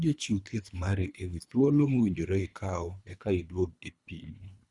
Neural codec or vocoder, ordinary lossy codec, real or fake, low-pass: codec, 24 kHz, 3 kbps, HILCodec; Opus, 64 kbps; fake; 10.8 kHz